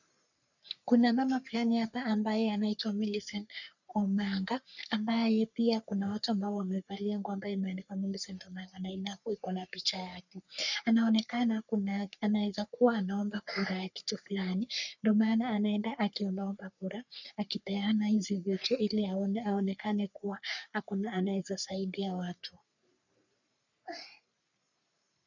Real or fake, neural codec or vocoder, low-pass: fake; codec, 44.1 kHz, 3.4 kbps, Pupu-Codec; 7.2 kHz